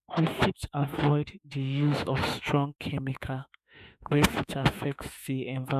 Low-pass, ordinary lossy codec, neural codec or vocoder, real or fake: 14.4 kHz; none; autoencoder, 48 kHz, 32 numbers a frame, DAC-VAE, trained on Japanese speech; fake